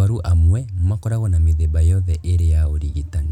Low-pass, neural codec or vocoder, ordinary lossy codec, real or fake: 19.8 kHz; none; none; real